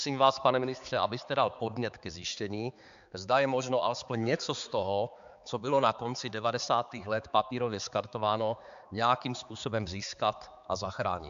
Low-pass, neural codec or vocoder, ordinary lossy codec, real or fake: 7.2 kHz; codec, 16 kHz, 4 kbps, X-Codec, HuBERT features, trained on balanced general audio; MP3, 64 kbps; fake